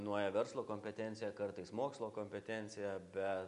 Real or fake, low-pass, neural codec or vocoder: real; 10.8 kHz; none